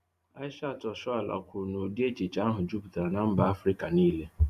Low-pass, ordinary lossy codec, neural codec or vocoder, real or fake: 14.4 kHz; Opus, 64 kbps; vocoder, 44.1 kHz, 128 mel bands every 512 samples, BigVGAN v2; fake